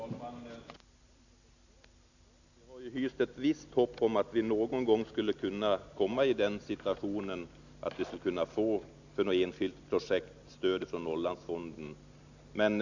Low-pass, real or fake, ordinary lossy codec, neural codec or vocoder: 7.2 kHz; real; none; none